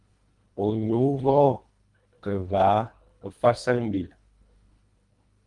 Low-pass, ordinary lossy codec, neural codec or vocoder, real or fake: 10.8 kHz; Opus, 32 kbps; codec, 24 kHz, 1.5 kbps, HILCodec; fake